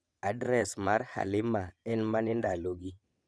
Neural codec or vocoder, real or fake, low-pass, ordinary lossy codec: vocoder, 22.05 kHz, 80 mel bands, WaveNeXt; fake; none; none